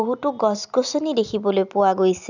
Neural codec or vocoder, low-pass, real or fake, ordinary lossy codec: none; 7.2 kHz; real; none